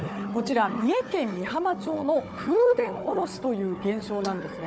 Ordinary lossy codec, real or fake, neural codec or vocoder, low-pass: none; fake; codec, 16 kHz, 4 kbps, FunCodec, trained on Chinese and English, 50 frames a second; none